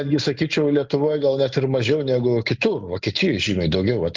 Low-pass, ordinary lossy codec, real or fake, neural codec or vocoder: 7.2 kHz; Opus, 24 kbps; real; none